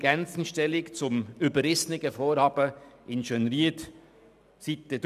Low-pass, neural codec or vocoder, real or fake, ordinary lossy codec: 14.4 kHz; none; real; none